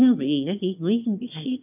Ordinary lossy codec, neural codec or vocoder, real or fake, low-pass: none; autoencoder, 22.05 kHz, a latent of 192 numbers a frame, VITS, trained on one speaker; fake; 3.6 kHz